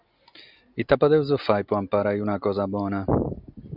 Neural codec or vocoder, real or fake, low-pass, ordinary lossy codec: none; real; 5.4 kHz; Opus, 64 kbps